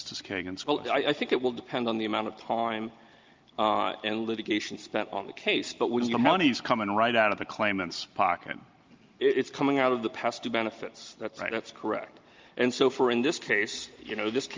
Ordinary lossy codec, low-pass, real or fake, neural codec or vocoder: Opus, 32 kbps; 7.2 kHz; real; none